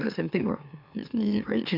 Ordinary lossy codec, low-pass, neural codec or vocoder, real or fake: none; 5.4 kHz; autoencoder, 44.1 kHz, a latent of 192 numbers a frame, MeloTTS; fake